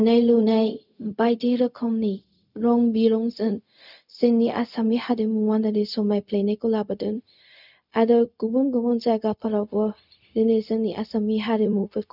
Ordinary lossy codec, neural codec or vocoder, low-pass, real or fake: none; codec, 16 kHz, 0.4 kbps, LongCat-Audio-Codec; 5.4 kHz; fake